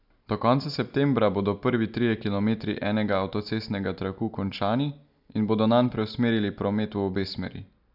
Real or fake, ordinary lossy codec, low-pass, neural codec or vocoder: real; none; 5.4 kHz; none